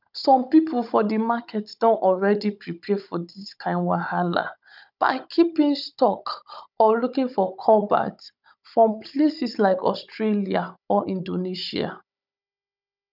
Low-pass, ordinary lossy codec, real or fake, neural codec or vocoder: 5.4 kHz; none; fake; codec, 16 kHz, 16 kbps, FunCodec, trained on Chinese and English, 50 frames a second